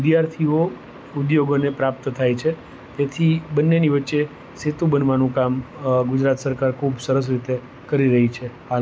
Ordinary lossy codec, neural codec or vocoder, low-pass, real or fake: none; none; none; real